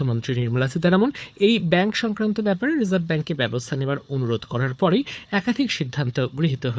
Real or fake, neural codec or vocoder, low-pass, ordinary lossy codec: fake; codec, 16 kHz, 4 kbps, FunCodec, trained on Chinese and English, 50 frames a second; none; none